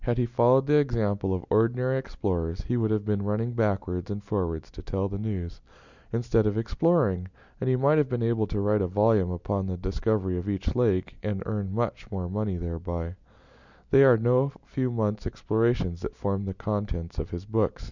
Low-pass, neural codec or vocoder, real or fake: 7.2 kHz; none; real